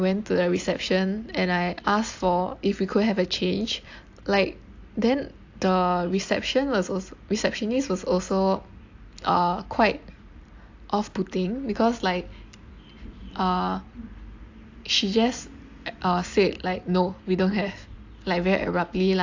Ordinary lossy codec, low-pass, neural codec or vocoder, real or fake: AAC, 48 kbps; 7.2 kHz; none; real